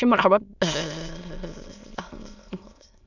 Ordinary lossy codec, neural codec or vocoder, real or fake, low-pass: none; autoencoder, 22.05 kHz, a latent of 192 numbers a frame, VITS, trained on many speakers; fake; 7.2 kHz